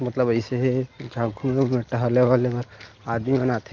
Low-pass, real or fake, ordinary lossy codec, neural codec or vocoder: 7.2 kHz; real; Opus, 24 kbps; none